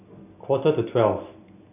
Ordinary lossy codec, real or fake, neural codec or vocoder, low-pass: none; real; none; 3.6 kHz